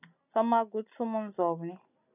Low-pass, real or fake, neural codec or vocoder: 3.6 kHz; real; none